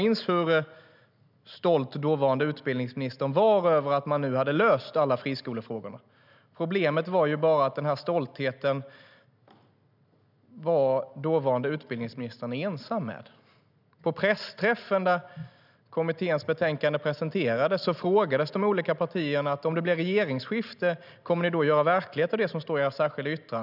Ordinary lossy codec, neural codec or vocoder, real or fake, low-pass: none; none; real; 5.4 kHz